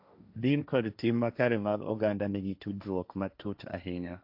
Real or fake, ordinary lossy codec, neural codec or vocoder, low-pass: fake; AAC, 48 kbps; codec, 16 kHz, 1.1 kbps, Voila-Tokenizer; 5.4 kHz